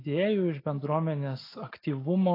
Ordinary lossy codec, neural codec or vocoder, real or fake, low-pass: AAC, 24 kbps; none; real; 5.4 kHz